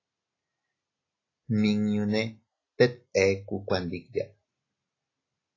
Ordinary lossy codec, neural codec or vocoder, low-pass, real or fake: AAC, 32 kbps; none; 7.2 kHz; real